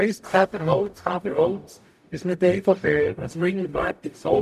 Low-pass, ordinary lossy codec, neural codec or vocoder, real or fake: 14.4 kHz; none; codec, 44.1 kHz, 0.9 kbps, DAC; fake